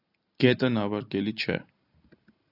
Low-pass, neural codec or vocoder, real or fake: 5.4 kHz; none; real